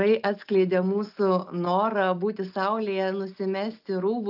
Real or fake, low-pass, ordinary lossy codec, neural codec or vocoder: real; 5.4 kHz; AAC, 48 kbps; none